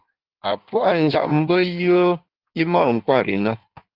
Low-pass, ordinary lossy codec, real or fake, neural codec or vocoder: 5.4 kHz; Opus, 24 kbps; fake; codec, 16 kHz in and 24 kHz out, 1.1 kbps, FireRedTTS-2 codec